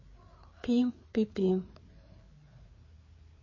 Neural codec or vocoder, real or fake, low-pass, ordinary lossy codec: codec, 16 kHz, 4 kbps, FreqCodec, larger model; fake; 7.2 kHz; MP3, 32 kbps